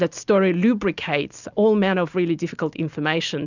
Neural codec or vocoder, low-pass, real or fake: none; 7.2 kHz; real